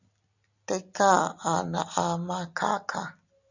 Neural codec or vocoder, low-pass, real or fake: none; 7.2 kHz; real